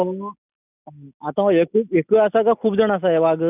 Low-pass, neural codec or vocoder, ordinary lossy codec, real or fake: 3.6 kHz; none; none; real